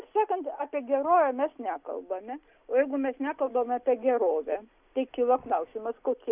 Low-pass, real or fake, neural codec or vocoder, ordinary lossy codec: 3.6 kHz; real; none; Opus, 64 kbps